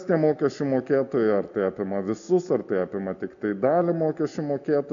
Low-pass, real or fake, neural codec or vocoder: 7.2 kHz; real; none